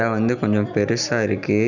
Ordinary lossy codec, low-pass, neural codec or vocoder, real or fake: none; 7.2 kHz; none; real